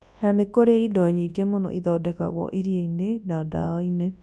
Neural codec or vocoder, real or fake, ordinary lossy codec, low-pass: codec, 24 kHz, 0.9 kbps, WavTokenizer, large speech release; fake; none; none